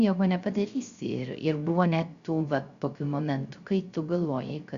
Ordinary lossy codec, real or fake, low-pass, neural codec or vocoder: Opus, 64 kbps; fake; 7.2 kHz; codec, 16 kHz, 0.3 kbps, FocalCodec